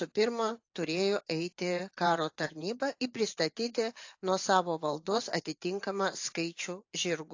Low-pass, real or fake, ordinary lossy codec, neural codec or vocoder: 7.2 kHz; fake; AAC, 48 kbps; vocoder, 24 kHz, 100 mel bands, Vocos